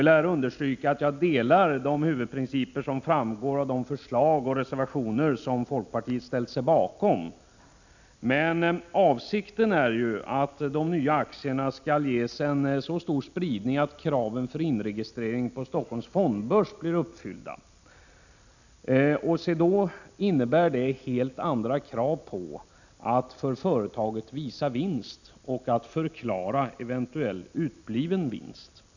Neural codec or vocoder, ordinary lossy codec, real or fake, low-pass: none; none; real; 7.2 kHz